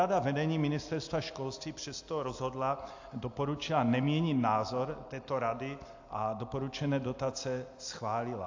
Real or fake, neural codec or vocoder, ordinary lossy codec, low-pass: real; none; AAC, 48 kbps; 7.2 kHz